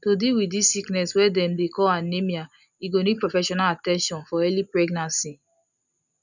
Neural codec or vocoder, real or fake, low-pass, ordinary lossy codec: none; real; 7.2 kHz; none